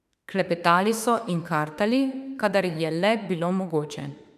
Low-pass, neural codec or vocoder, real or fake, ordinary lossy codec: 14.4 kHz; autoencoder, 48 kHz, 32 numbers a frame, DAC-VAE, trained on Japanese speech; fake; none